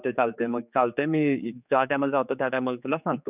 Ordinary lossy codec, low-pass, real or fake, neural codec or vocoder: none; 3.6 kHz; fake; codec, 16 kHz, 4 kbps, X-Codec, HuBERT features, trained on general audio